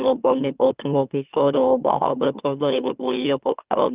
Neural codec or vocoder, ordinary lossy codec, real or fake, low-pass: autoencoder, 44.1 kHz, a latent of 192 numbers a frame, MeloTTS; Opus, 32 kbps; fake; 3.6 kHz